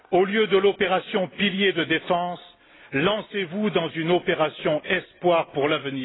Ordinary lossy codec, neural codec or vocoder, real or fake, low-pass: AAC, 16 kbps; none; real; 7.2 kHz